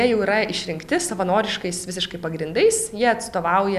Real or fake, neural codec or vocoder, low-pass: real; none; 14.4 kHz